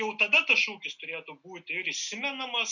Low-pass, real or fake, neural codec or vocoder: 7.2 kHz; real; none